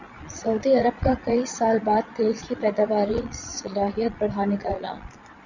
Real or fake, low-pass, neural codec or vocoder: fake; 7.2 kHz; vocoder, 22.05 kHz, 80 mel bands, Vocos